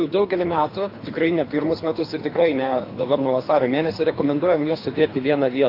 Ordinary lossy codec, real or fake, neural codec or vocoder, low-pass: AAC, 32 kbps; fake; codec, 24 kHz, 3 kbps, HILCodec; 5.4 kHz